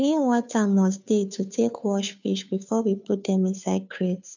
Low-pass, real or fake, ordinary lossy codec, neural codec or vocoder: 7.2 kHz; fake; none; codec, 16 kHz, 2 kbps, FunCodec, trained on Chinese and English, 25 frames a second